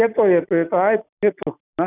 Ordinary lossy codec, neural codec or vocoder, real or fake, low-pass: none; none; real; 3.6 kHz